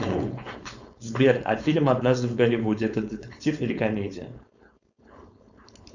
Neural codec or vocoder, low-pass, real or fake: codec, 16 kHz, 4.8 kbps, FACodec; 7.2 kHz; fake